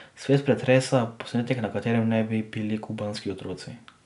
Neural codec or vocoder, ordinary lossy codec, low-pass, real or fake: none; none; 10.8 kHz; real